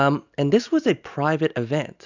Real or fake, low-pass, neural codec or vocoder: real; 7.2 kHz; none